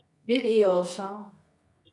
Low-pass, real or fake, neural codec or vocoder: 10.8 kHz; fake; codec, 24 kHz, 0.9 kbps, WavTokenizer, medium music audio release